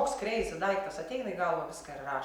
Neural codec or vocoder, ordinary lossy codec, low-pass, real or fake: none; Opus, 64 kbps; 19.8 kHz; real